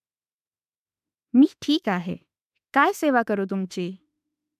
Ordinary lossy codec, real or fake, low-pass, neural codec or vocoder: none; fake; 14.4 kHz; autoencoder, 48 kHz, 32 numbers a frame, DAC-VAE, trained on Japanese speech